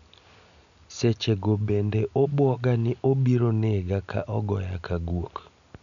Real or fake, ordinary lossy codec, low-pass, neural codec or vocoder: real; none; 7.2 kHz; none